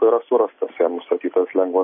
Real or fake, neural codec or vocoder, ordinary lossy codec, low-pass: real; none; MP3, 24 kbps; 7.2 kHz